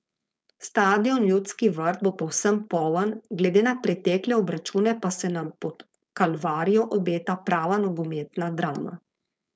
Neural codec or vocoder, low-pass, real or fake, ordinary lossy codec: codec, 16 kHz, 4.8 kbps, FACodec; none; fake; none